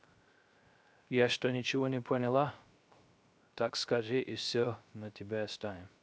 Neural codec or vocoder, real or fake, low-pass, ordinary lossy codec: codec, 16 kHz, 0.3 kbps, FocalCodec; fake; none; none